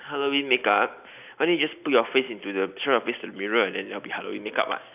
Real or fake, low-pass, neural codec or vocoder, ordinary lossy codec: real; 3.6 kHz; none; none